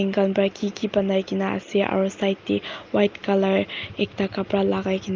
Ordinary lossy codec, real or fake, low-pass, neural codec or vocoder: Opus, 24 kbps; real; 7.2 kHz; none